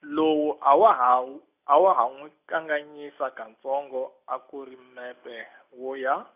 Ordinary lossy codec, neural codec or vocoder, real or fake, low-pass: none; none; real; 3.6 kHz